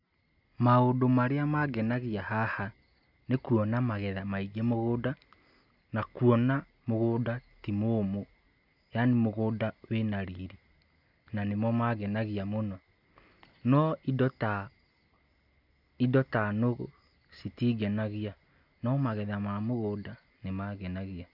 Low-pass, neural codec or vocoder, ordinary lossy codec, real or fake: 5.4 kHz; none; none; real